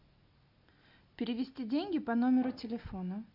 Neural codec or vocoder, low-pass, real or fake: none; 5.4 kHz; real